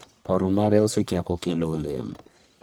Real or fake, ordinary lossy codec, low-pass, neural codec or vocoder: fake; none; none; codec, 44.1 kHz, 1.7 kbps, Pupu-Codec